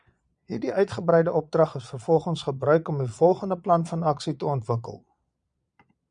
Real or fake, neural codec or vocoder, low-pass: fake; vocoder, 22.05 kHz, 80 mel bands, Vocos; 9.9 kHz